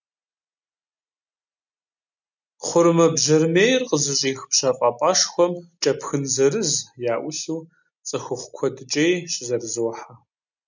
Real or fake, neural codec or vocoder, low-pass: real; none; 7.2 kHz